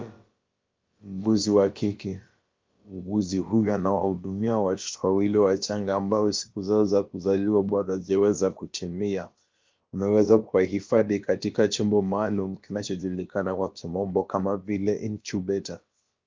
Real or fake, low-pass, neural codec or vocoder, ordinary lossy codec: fake; 7.2 kHz; codec, 16 kHz, about 1 kbps, DyCAST, with the encoder's durations; Opus, 32 kbps